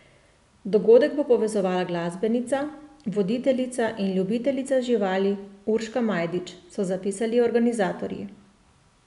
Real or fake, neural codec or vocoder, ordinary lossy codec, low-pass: real; none; none; 10.8 kHz